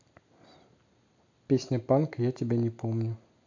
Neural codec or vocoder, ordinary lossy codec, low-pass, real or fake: none; AAC, 48 kbps; 7.2 kHz; real